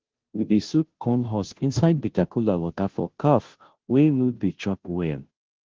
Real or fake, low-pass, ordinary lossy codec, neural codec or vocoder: fake; 7.2 kHz; Opus, 16 kbps; codec, 16 kHz, 0.5 kbps, FunCodec, trained on Chinese and English, 25 frames a second